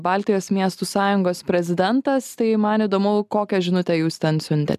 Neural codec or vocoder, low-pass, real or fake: none; 14.4 kHz; real